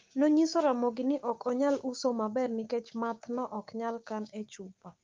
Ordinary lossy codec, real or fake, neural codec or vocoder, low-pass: Opus, 24 kbps; fake; codec, 16 kHz, 6 kbps, DAC; 7.2 kHz